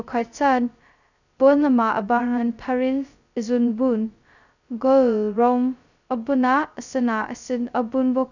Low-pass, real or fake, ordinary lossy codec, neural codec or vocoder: 7.2 kHz; fake; none; codec, 16 kHz, 0.2 kbps, FocalCodec